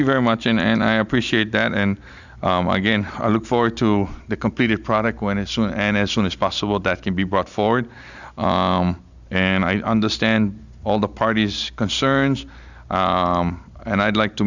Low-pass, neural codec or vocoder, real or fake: 7.2 kHz; none; real